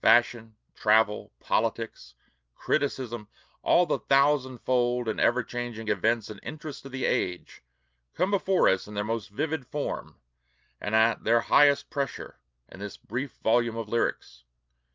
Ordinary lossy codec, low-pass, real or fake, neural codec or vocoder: Opus, 24 kbps; 7.2 kHz; real; none